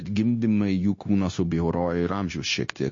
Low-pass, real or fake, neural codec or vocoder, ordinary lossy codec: 7.2 kHz; fake; codec, 16 kHz, 0.9 kbps, LongCat-Audio-Codec; MP3, 32 kbps